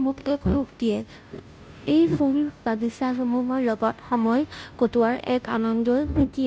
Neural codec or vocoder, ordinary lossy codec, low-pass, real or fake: codec, 16 kHz, 0.5 kbps, FunCodec, trained on Chinese and English, 25 frames a second; none; none; fake